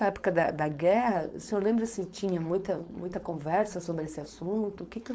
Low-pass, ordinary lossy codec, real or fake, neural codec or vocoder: none; none; fake; codec, 16 kHz, 4.8 kbps, FACodec